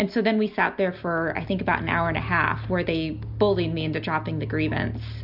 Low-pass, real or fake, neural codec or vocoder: 5.4 kHz; real; none